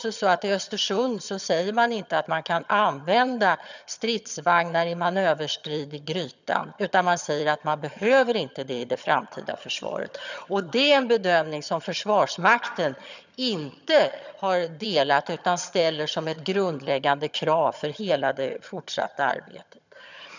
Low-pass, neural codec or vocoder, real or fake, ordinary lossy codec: 7.2 kHz; vocoder, 22.05 kHz, 80 mel bands, HiFi-GAN; fake; none